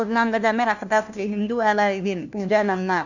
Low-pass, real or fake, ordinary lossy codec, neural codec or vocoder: 7.2 kHz; fake; none; codec, 16 kHz, 1 kbps, FunCodec, trained on LibriTTS, 50 frames a second